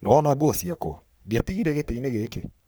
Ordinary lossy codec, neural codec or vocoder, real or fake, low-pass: none; codec, 44.1 kHz, 3.4 kbps, Pupu-Codec; fake; none